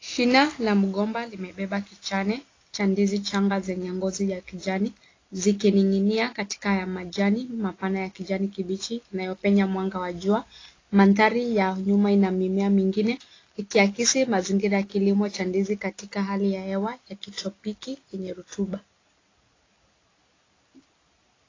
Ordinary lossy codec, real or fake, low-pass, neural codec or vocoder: AAC, 32 kbps; real; 7.2 kHz; none